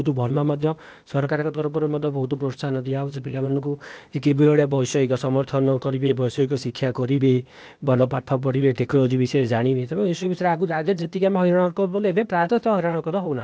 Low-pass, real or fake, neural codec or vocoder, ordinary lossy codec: none; fake; codec, 16 kHz, 0.8 kbps, ZipCodec; none